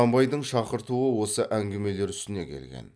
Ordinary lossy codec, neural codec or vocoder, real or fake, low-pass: none; none; real; none